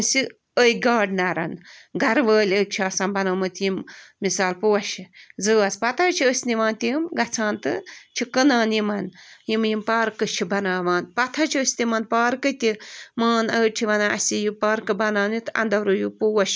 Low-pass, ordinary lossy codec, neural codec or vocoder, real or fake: none; none; none; real